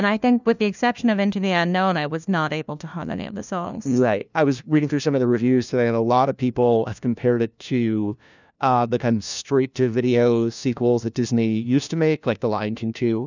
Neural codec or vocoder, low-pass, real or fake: codec, 16 kHz, 1 kbps, FunCodec, trained on LibriTTS, 50 frames a second; 7.2 kHz; fake